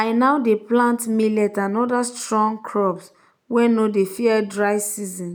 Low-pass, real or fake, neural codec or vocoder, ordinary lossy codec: none; real; none; none